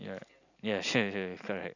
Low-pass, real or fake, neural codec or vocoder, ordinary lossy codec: 7.2 kHz; real; none; none